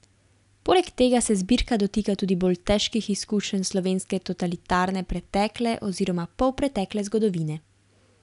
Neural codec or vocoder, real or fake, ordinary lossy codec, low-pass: none; real; none; 10.8 kHz